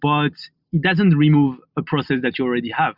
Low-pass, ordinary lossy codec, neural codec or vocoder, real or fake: 5.4 kHz; Opus, 64 kbps; none; real